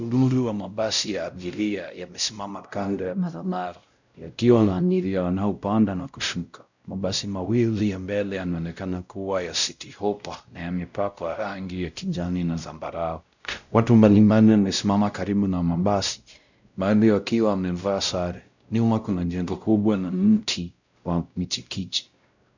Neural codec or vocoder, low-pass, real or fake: codec, 16 kHz, 0.5 kbps, X-Codec, WavLM features, trained on Multilingual LibriSpeech; 7.2 kHz; fake